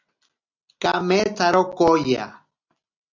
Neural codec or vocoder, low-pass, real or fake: none; 7.2 kHz; real